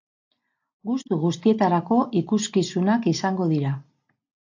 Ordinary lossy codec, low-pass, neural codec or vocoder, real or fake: AAC, 48 kbps; 7.2 kHz; none; real